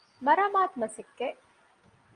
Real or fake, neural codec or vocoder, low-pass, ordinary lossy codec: real; none; 9.9 kHz; Opus, 32 kbps